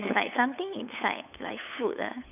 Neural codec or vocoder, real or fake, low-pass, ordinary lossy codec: codec, 16 kHz, 8 kbps, FunCodec, trained on LibriTTS, 25 frames a second; fake; 3.6 kHz; none